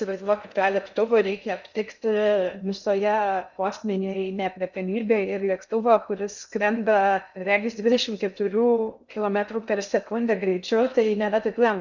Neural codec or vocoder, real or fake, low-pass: codec, 16 kHz in and 24 kHz out, 0.6 kbps, FocalCodec, streaming, 2048 codes; fake; 7.2 kHz